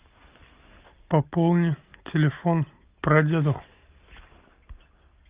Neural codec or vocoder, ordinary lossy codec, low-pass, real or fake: none; Opus, 24 kbps; 3.6 kHz; real